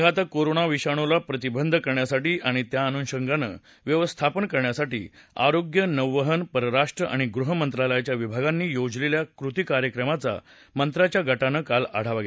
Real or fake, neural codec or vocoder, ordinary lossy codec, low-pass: real; none; none; none